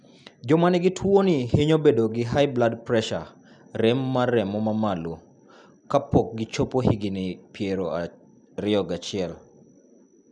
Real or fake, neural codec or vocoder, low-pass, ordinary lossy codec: real; none; 10.8 kHz; none